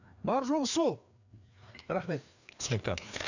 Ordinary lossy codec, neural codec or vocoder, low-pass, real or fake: none; codec, 16 kHz, 2 kbps, FreqCodec, larger model; 7.2 kHz; fake